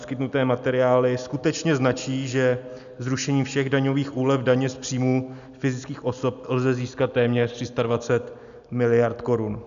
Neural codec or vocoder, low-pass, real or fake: none; 7.2 kHz; real